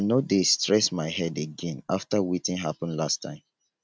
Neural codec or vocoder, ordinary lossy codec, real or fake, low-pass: none; none; real; none